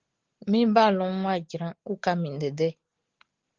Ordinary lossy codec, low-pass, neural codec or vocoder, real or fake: Opus, 16 kbps; 7.2 kHz; none; real